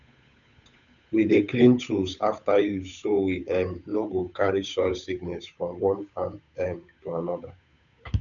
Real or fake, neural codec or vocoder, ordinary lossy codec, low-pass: fake; codec, 16 kHz, 8 kbps, FunCodec, trained on Chinese and English, 25 frames a second; none; 7.2 kHz